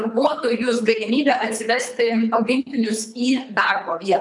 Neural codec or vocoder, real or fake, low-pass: codec, 24 kHz, 3 kbps, HILCodec; fake; 10.8 kHz